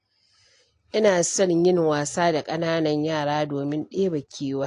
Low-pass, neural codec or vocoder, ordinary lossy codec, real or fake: 10.8 kHz; none; AAC, 48 kbps; real